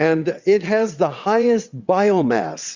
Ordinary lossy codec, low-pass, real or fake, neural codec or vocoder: Opus, 64 kbps; 7.2 kHz; fake; vocoder, 22.05 kHz, 80 mel bands, WaveNeXt